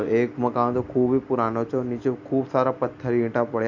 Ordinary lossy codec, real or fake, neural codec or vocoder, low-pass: none; real; none; 7.2 kHz